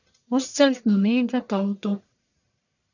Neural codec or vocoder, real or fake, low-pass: codec, 44.1 kHz, 1.7 kbps, Pupu-Codec; fake; 7.2 kHz